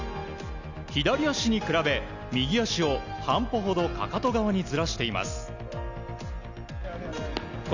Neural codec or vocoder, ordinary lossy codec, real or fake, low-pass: none; none; real; 7.2 kHz